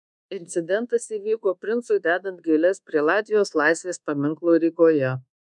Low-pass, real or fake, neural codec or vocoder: 10.8 kHz; fake; codec, 24 kHz, 1.2 kbps, DualCodec